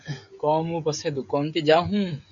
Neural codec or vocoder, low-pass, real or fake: codec, 16 kHz, 16 kbps, FreqCodec, smaller model; 7.2 kHz; fake